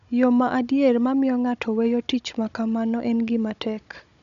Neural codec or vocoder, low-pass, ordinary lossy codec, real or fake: codec, 16 kHz, 16 kbps, FunCodec, trained on Chinese and English, 50 frames a second; 7.2 kHz; none; fake